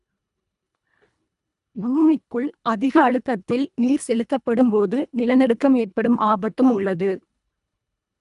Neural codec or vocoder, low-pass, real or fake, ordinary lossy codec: codec, 24 kHz, 1.5 kbps, HILCodec; 10.8 kHz; fake; none